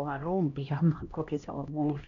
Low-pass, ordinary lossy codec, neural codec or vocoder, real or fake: 7.2 kHz; none; codec, 16 kHz, 1 kbps, X-Codec, HuBERT features, trained on LibriSpeech; fake